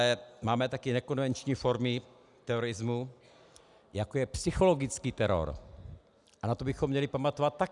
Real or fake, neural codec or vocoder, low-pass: real; none; 10.8 kHz